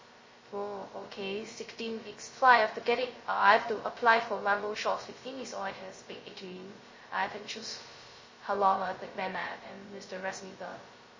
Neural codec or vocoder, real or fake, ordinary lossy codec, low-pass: codec, 16 kHz, 0.2 kbps, FocalCodec; fake; MP3, 32 kbps; 7.2 kHz